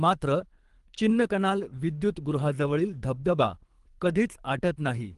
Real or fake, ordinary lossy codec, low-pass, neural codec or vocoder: fake; Opus, 24 kbps; 10.8 kHz; codec, 24 kHz, 3 kbps, HILCodec